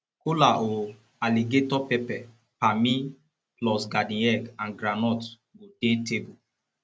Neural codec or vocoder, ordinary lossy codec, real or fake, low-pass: none; none; real; none